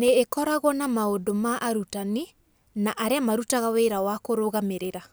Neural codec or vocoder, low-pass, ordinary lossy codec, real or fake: none; none; none; real